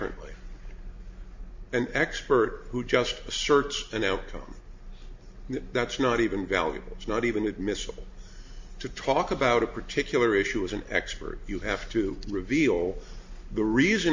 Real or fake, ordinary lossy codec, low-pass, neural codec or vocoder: real; MP3, 48 kbps; 7.2 kHz; none